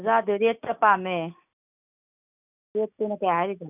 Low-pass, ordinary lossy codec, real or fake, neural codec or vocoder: 3.6 kHz; none; real; none